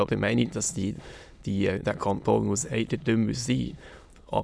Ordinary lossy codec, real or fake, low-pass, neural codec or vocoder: none; fake; none; autoencoder, 22.05 kHz, a latent of 192 numbers a frame, VITS, trained on many speakers